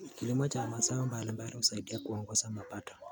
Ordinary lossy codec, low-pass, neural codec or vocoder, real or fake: none; none; none; real